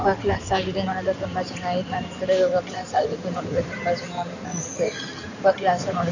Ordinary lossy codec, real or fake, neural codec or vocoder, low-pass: none; fake; codec, 16 kHz in and 24 kHz out, 2.2 kbps, FireRedTTS-2 codec; 7.2 kHz